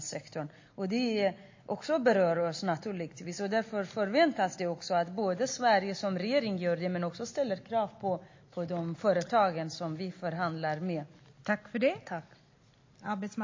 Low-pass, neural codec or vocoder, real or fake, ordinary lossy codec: 7.2 kHz; none; real; MP3, 32 kbps